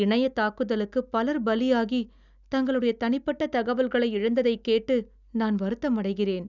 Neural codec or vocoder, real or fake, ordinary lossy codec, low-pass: none; real; none; 7.2 kHz